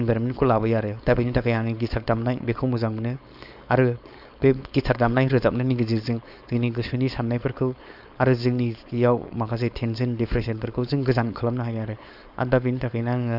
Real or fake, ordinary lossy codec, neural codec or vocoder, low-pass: fake; none; codec, 16 kHz, 4.8 kbps, FACodec; 5.4 kHz